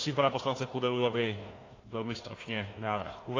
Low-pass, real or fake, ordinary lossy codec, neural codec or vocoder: 7.2 kHz; fake; AAC, 32 kbps; codec, 16 kHz, 1 kbps, FunCodec, trained on Chinese and English, 50 frames a second